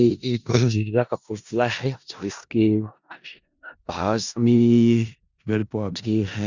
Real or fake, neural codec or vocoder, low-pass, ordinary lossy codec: fake; codec, 16 kHz in and 24 kHz out, 0.4 kbps, LongCat-Audio-Codec, four codebook decoder; 7.2 kHz; Opus, 64 kbps